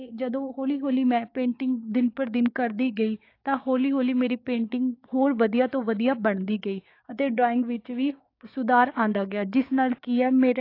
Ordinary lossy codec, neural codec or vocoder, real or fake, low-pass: AAC, 32 kbps; codec, 16 kHz, 6 kbps, DAC; fake; 5.4 kHz